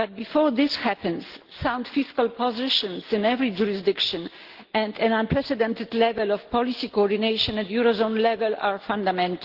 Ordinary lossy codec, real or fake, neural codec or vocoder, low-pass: Opus, 16 kbps; real; none; 5.4 kHz